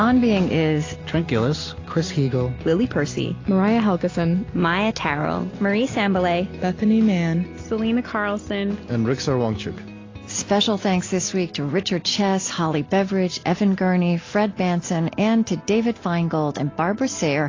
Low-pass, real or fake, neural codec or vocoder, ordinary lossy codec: 7.2 kHz; real; none; AAC, 32 kbps